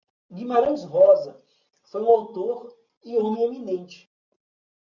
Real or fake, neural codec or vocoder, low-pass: real; none; 7.2 kHz